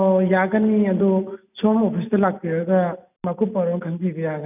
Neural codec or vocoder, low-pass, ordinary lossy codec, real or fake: none; 3.6 kHz; none; real